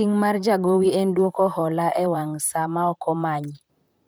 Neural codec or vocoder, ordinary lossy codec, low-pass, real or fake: vocoder, 44.1 kHz, 128 mel bands, Pupu-Vocoder; none; none; fake